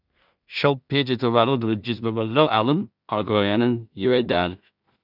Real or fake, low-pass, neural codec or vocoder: fake; 5.4 kHz; codec, 16 kHz in and 24 kHz out, 0.4 kbps, LongCat-Audio-Codec, two codebook decoder